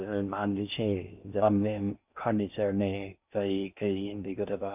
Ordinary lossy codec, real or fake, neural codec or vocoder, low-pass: none; fake; codec, 16 kHz in and 24 kHz out, 0.6 kbps, FocalCodec, streaming, 4096 codes; 3.6 kHz